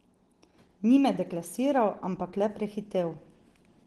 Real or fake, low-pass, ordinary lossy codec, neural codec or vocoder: real; 10.8 kHz; Opus, 16 kbps; none